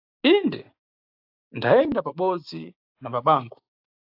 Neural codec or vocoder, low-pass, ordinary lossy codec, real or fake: codec, 16 kHz, 6 kbps, DAC; 5.4 kHz; AAC, 48 kbps; fake